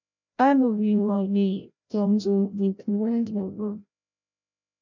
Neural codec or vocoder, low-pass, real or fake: codec, 16 kHz, 0.5 kbps, FreqCodec, larger model; 7.2 kHz; fake